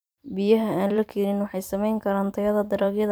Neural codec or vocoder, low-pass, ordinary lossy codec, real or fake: vocoder, 44.1 kHz, 128 mel bands every 256 samples, BigVGAN v2; none; none; fake